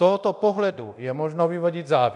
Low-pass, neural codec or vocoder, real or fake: 10.8 kHz; codec, 24 kHz, 0.9 kbps, DualCodec; fake